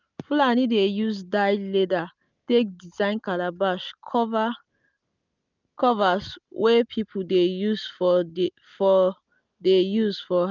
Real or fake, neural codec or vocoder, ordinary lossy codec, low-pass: real; none; none; 7.2 kHz